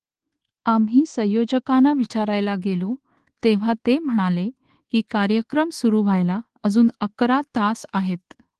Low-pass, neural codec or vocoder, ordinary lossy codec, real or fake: 10.8 kHz; codec, 24 kHz, 1.2 kbps, DualCodec; Opus, 16 kbps; fake